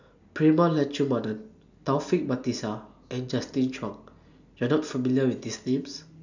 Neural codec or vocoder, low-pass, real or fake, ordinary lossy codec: none; 7.2 kHz; real; none